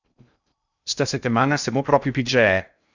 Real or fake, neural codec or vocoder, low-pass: fake; codec, 16 kHz in and 24 kHz out, 0.6 kbps, FocalCodec, streaming, 2048 codes; 7.2 kHz